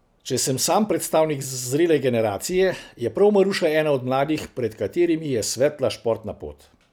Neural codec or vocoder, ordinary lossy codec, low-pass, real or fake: none; none; none; real